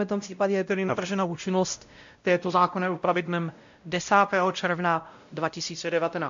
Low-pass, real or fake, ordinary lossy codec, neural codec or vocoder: 7.2 kHz; fake; AAC, 64 kbps; codec, 16 kHz, 0.5 kbps, X-Codec, WavLM features, trained on Multilingual LibriSpeech